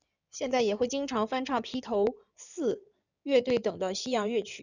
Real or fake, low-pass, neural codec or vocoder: fake; 7.2 kHz; codec, 16 kHz in and 24 kHz out, 2.2 kbps, FireRedTTS-2 codec